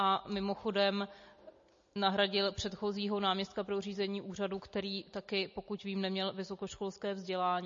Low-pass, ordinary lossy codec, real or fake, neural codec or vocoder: 7.2 kHz; MP3, 32 kbps; real; none